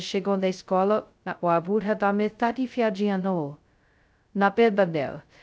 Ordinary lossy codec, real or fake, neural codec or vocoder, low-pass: none; fake; codec, 16 kHz, 0.2 kbps, FocalCodec; none